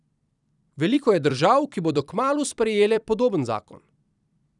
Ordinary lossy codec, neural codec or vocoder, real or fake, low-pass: none; vocoder, 44.1 kHz, 128 mel bands every 256 samples, BigVGAN v2; fake; 10.8 kHz